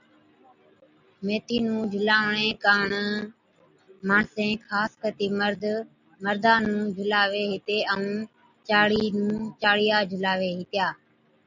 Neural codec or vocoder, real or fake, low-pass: none; real; 7.2 kHz